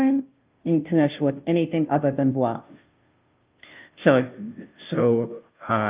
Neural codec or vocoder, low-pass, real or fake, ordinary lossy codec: codec, 16 kHz, 0.5 kbps, FunCodec, trained on Chinese and English, 25 frames a second; 3.6 kHz; fake; Opus, 24 kbps